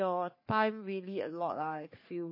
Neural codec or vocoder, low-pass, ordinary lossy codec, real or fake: codec, 44.1 kHz, 3.4 kbps, Pupu-Codec; 5.4 kHz; MP3, 24 kbps; fake